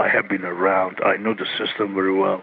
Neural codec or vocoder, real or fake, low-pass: none; real; 7.2 kHz